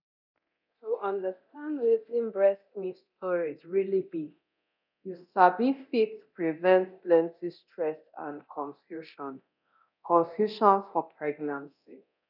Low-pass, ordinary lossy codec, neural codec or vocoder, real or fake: 5.4 kHz; none; codec, 24 kHz, 0.9 kbps, DualCodec; fake